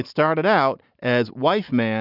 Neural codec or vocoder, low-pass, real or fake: none; 5.4 kHz; real